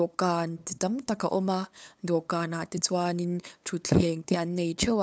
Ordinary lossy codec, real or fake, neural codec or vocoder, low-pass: none; fake; codec, 16 kHz, 8 kbps, FunCodec, trained on LibriTTS, 25 frames a second; none